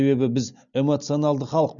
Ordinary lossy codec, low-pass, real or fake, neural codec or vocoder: none; 7.2 kHz; real; none